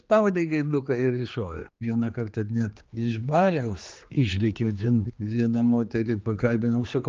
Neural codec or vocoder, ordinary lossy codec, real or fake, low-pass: codec, 16 kHz, 2 kbps, X-Codec, HuBERT features, trained on general audio; Opus, 24 kbps; fake; 7.2 kHz